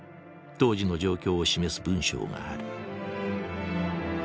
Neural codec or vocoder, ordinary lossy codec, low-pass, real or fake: none; none; none; real